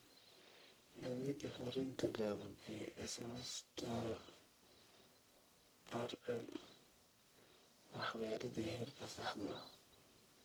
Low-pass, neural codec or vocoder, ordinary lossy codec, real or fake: none; codec, 44.1 kHz, 1.7 kbps, Pupu-Codec; none; fake